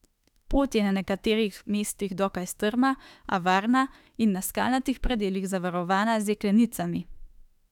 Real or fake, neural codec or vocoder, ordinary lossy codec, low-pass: fake; autoencoder, 48 kHz, 32 numbers a frame, DAC-VAE, trained on Japanese speech; none; 19.8 kHz